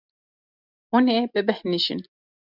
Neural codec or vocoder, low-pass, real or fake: none; 5.4 kHz; real